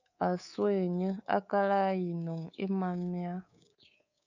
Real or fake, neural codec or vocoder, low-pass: fake; codec, 24 kHz, 3.1 kbps, DualCodec; 7.2 kHz